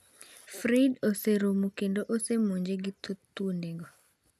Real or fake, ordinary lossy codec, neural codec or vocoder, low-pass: real; none; none; 14.4 kHz